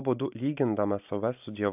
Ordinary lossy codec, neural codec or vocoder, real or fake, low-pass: AAC, 32 kbps; none; real; 3.6 kHz